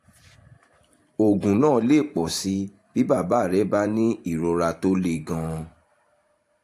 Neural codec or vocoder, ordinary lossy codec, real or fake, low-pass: vocoder, 44.1 kHz, 128 mel bands every 512 samples, BigVGAN v2; AAC, 64 kbps; fake; 14.4 kHz